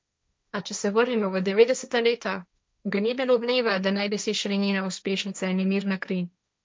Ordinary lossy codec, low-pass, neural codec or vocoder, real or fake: none; none; codec, 16 kHz, 1.1 kbps, Voila-Tokenizer; fake